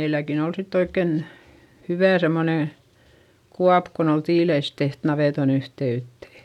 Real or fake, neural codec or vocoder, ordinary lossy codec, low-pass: real; none; none; 19.8 kHz